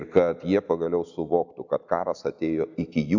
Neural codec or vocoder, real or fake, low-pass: none; real; 7.2 kHz